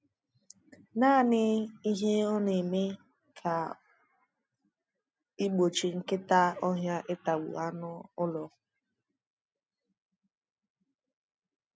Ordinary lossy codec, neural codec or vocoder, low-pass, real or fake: none; none; none; real